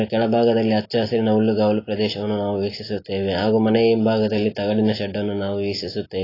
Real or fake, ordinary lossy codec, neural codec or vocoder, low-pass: real; AAC, 24 kbps; none; 5.4 kHz